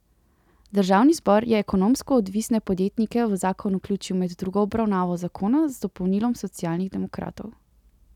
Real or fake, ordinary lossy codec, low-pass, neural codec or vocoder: real; none; 19.8 kHz; none